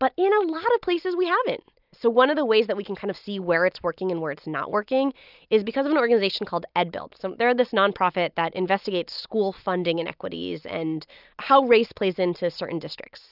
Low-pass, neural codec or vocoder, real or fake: 5.4 kHz; none; real